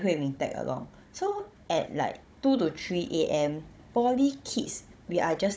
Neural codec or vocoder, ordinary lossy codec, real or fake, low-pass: codec, 16 kHz, 16 kbps, FunCodec, trained on Chinese and English, 50 frames a second; none; fake; none